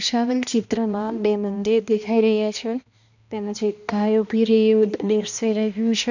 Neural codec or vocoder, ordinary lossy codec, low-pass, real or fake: codec, 16 kHz, 1 kbps, X-Codec, HuBERT features, trained on balanced general audio; none; 7.2 kHz; fake